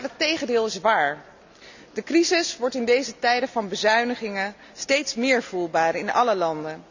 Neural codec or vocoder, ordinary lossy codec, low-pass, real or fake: none; none; 7.2 kHz; real